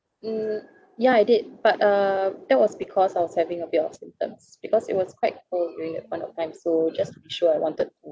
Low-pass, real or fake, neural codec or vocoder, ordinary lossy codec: none; real; none; none